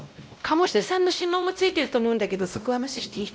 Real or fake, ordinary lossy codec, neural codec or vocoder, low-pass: fake; none; codec, 16 kHz, 0.5 kbps, X-Codec, WavLM features, trained on Multilingual LibriSpeech; none